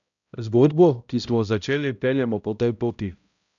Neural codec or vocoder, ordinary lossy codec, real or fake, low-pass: codec, 16 kHz, 0.5 kbps, X-Codec, HuBERT features, trained on balanced general audio; none; fake; 7.2 kHz